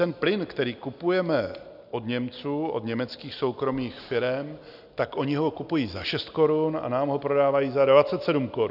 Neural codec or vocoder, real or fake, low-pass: none; real; 5.4 kHz